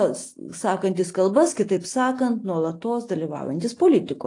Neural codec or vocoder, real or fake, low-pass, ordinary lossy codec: none; real; 10.8 kHz; AAC, 48 kbps